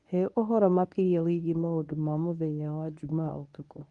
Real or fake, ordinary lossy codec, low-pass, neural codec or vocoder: fake; Opus, 32 kbps; 10.8 kHz; codec, 24 kHz, 0.9 kbps, WavTokenizer, medium speech release version 1